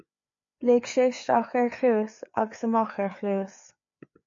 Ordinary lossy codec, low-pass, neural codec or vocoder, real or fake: MP3, 48 kbps; 7.2 kHz; codec, 16 kHz, 4 kbps, FreqCodec, larger model; fake